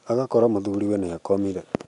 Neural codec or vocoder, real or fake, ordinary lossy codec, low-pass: none; real; none; 10.8 kHz